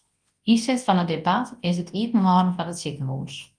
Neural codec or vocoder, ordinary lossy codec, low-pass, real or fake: codec, 24 kHz, 0.9 kbps, WavTokenizer, large speech release; Opus, 24 kbps; 9.9 kHz; fake